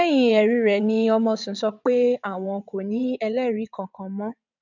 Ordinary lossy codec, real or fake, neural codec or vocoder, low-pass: none; fake; vocoder, 22.05 kHz, 80 mel bands, WaveNeXt; 7.2 kHz